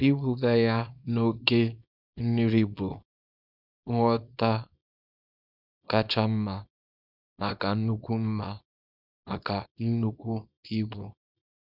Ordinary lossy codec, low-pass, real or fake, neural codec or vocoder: none; 5.4 kHz; fake; codec, 24 kHz, 0.9 kbps, WavTokenizer, small release